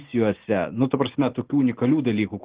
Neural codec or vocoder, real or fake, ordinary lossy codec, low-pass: none; real; Opus, 16 kbps; 3.6 kHz